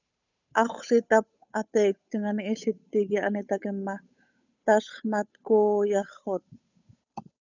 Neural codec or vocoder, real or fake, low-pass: codec, 16 kHz, 8 kbps, FunCodec, trained on Chinese and English, 25 frames a second; fake; 7.2 kHz